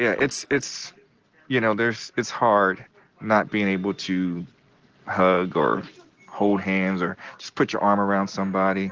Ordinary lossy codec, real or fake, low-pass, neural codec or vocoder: Opus, 16 kbps; real; 7.2 kHz; none